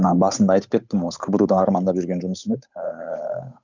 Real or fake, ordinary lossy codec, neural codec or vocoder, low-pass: fake; none; codec, 16 kHz, 8 kbps, FunCodec, trained on Chinese and English, 25 frames a second; 7.2 kHz